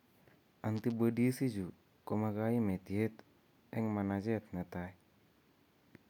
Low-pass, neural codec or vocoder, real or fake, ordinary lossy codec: 19.8 kHz; none; real; none